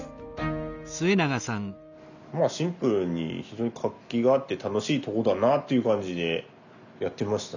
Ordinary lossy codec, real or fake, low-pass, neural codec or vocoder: none; real; 7.2 kHz; none